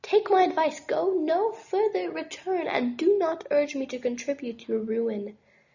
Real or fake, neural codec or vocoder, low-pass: real; none; 7.2 kHz